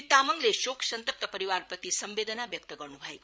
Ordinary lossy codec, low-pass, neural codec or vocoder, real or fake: none; none; codec, 16 kHz, 8 kbps, FreqCodec, larger model; fake